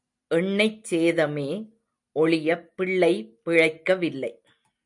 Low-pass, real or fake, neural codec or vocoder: 10.8 kHz; real; none